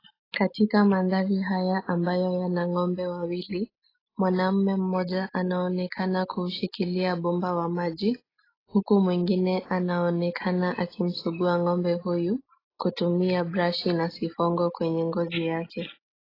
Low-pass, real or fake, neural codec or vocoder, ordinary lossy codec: 5.4 kHz; real; none; AAC, 24 kbps